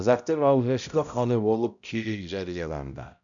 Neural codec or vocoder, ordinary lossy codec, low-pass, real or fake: codec, 16 kHz, 0.5 kbps, X-Codec, HuBERT features, trained on balanced general audio; none; 7.2 kHz; fake